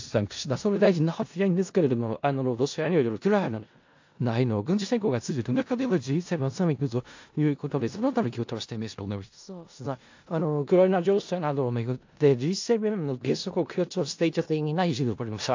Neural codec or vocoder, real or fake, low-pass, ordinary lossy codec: codec, 16 kHz in and 24 kHz out, 0.4 kbps, LongCat-Audio-Codec, four codebook decoder; fake; 7.2 kHz; AAC, 48 kbps